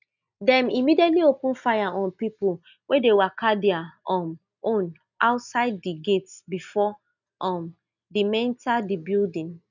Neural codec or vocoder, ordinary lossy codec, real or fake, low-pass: none; none; real; 7.2 kHz